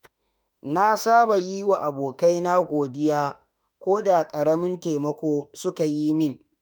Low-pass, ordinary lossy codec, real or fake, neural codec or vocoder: none; none; fake; autoencoder, 48 kHz, 32 numbers a frame, DAC-VAE, trained on Japanese speech